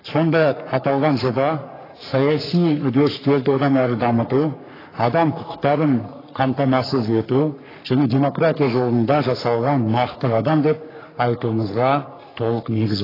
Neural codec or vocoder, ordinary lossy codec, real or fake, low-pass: codec, 44.1 kHz, 3.4 kbps, Pupu-Codec; AAC, 24 kbps; fake; 5.4 kHz